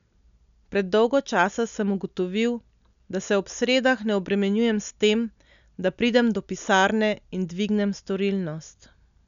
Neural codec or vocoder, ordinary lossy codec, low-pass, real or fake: none; none; 7.2 kHz; real